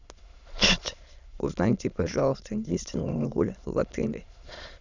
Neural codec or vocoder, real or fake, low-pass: autoencoder, 22.05 kHz, a latent of 192 numbers a frame, VITS, trained on many speakers; fake; 7.2 kHz